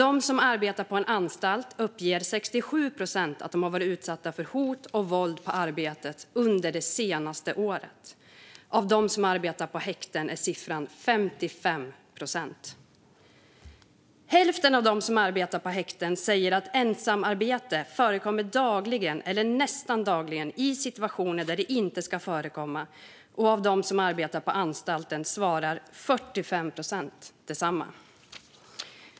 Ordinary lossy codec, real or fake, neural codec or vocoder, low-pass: none; real; none; none